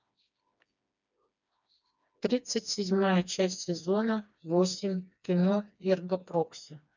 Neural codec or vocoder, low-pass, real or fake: codec, 16 kHz, 2 kbps, FreqCodec, smaller model; 7.2 kHz; fake